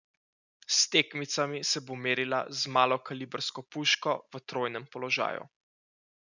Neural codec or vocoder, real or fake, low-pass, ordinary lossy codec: none; real; 7.2 kHz; none